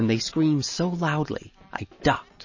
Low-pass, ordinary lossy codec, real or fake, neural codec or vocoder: 7.2 kHz; MP3, 32 kbps; real; none